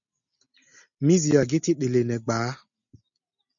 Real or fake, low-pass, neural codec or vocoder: real; 7.2 kHz; none